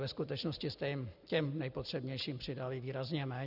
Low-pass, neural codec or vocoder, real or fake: 5.4 kHz; none; real